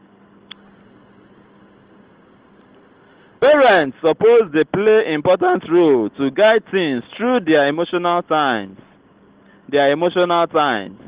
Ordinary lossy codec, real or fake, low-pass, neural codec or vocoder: Opus, 16 kbps; real; 3.6 kHz; none